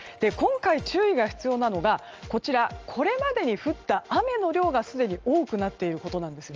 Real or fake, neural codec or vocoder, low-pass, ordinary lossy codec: real; none; 7.2 kHz; Opus, 24 kbps